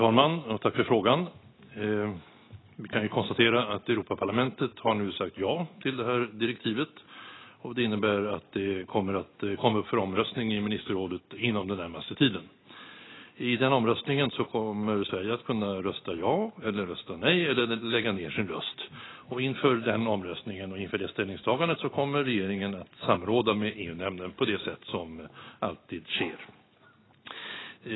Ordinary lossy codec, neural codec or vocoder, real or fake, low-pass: AAC, 16 kbps; none; real; 7.2 kHz